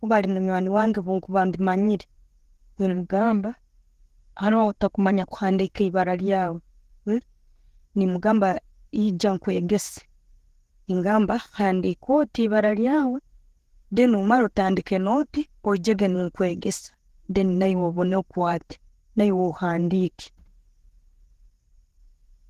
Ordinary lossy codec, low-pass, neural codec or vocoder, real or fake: Opus, 16 kbps; 14.4 kHz; vocoder, 48 kHz, 128 mel bands, Vocos; fake